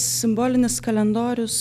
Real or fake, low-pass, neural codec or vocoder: real; 14.4 kHz; none